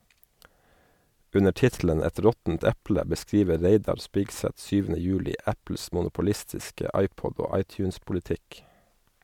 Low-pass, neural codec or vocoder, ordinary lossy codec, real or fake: 19.8 kHz; none; MP3, 96 kbps; real